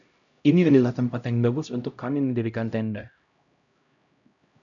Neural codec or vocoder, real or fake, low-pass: codec, 16 kHz, 0.5 kbps, X-Codec, HuBERT features, trained on LibriSpeech; fake; 7.2 kHz